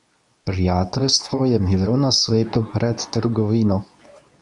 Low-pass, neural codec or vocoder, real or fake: 10.8 kHz; codec, 24 kHz, 0.9 kbps, WavTokenizer, medium speech release version 2; fake